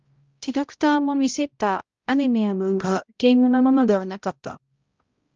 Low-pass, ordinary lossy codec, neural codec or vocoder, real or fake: 7.2 kHz; Opus, 24 kbps; codec, 16 kHz, 0.5 kbps, X-Codec, HuBERT features, trained on balanced general audio; fake